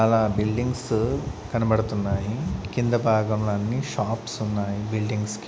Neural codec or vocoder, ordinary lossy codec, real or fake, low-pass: none; none; real; none